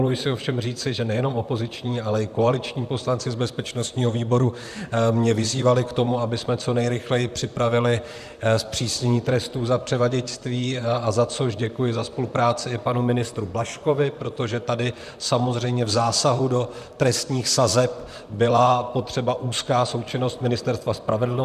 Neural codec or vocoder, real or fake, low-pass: vocoder, 44.1 kHz, 128 mel bands, Pupu-Vocoder; fake; 14.4 kHz